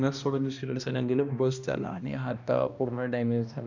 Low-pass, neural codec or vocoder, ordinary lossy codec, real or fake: 7.2 kHz; codec, 16 kHz, 1 kbps, X-Codec, HuBERT features, trained on balanced general audio; none; fake